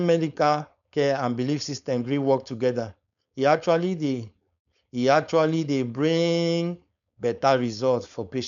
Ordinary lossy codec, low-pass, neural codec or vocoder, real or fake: none; 7.2 kHz; codec, 16 kHz, 4.8 kbps, FACodec; fake